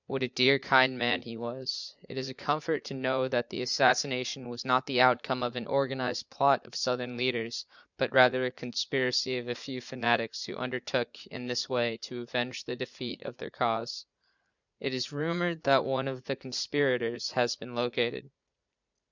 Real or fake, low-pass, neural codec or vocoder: fake; 7.2 kHz; vocoder, 44.1 kHz, 80 mel bands, Vocos